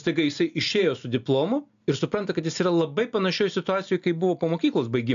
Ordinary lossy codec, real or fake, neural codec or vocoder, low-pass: AAC, 64 kbps; real; none; 7.2 kHz